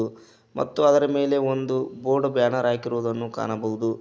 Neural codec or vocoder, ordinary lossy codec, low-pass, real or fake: none; none; none; real